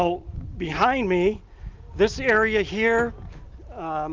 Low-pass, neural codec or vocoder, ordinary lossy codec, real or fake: 7.2 kHz; none; Opus, 24 kbps; real